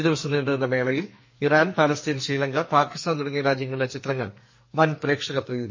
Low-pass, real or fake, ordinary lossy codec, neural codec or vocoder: 7.2 kHz; fake; MP3, 32 kbps; codec, 44.1 kHz, 2.6 kbps, SNAC